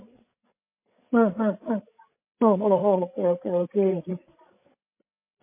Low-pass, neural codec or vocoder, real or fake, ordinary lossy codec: 3.6 kHz; codec, 16 kHz, 8 kbps, FreqCodec, larger model; fake; MP3, 24 kbps